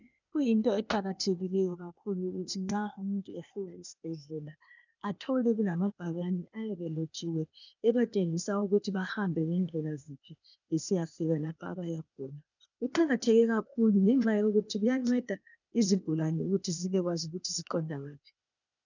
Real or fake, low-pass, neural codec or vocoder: fake; 7.2 kHz; codec, 16 kHz, 0.8 kbps, ZipCodec